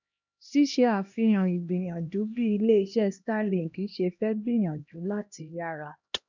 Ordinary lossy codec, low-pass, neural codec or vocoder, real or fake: none; 7.2 kHz; codec, 16 kHz, 1 kbps, X-Codec, HuBERT features, trained on LibriSpeech; fake